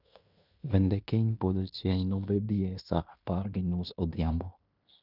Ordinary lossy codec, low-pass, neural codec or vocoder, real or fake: none; 5.4 kHz; codec, 16 kHz in and 24 kHz out, 0.9 kbps, LongCat-Audio-Codec, fine tuned four codebook decoder; fake